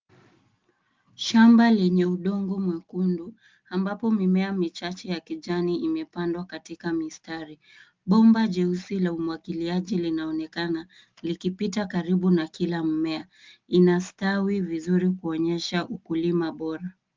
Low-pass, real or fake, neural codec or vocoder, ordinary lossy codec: 7.2 kHz; real; none; Opus, 32 kbps